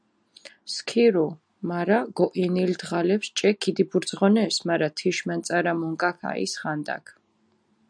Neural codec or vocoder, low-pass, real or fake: none; 9.9 kHz; real